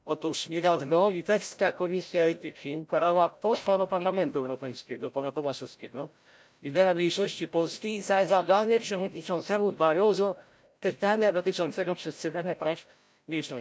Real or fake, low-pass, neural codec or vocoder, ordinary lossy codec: fake; none; codec, 16 kHz, 0.5 kbps, FreqCodec, larger model; none